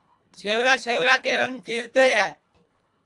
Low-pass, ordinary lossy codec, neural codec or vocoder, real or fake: 10.8 kHz; AAC, 64 kbps; codec, 24 kHz, 1.5 kbps, HILCodec; fake